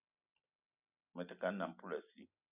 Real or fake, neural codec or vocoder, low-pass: real; none; 3.6 kHz